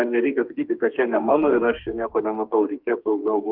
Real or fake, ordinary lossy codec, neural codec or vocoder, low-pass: fake; Opus, 32 kbps; codec, 32 kHz, 1.9 kbps, SNAC; 5.4 kHz